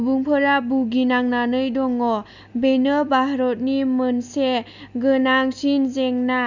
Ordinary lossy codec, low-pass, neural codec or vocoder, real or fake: none; 7.2 kHz; none; real